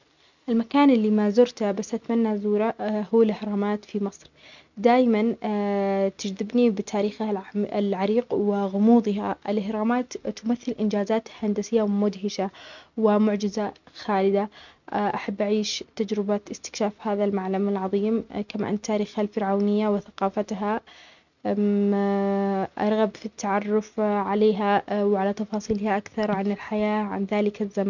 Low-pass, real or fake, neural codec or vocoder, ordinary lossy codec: 7.2 kHz; real; none; none